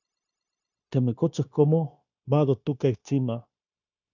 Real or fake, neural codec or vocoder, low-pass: fake; codec, 16 kHz, 0.9 kbps, LongCat-Audio-Codec; 7.2 kHz